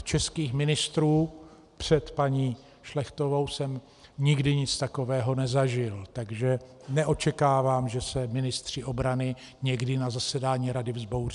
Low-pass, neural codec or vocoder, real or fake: 10.8 kHz; none; real